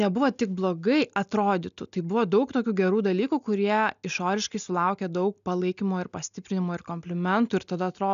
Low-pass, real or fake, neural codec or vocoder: 7.2 kHz; real; none